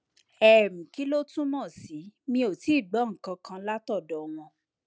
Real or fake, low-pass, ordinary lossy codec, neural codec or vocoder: real; none; none; none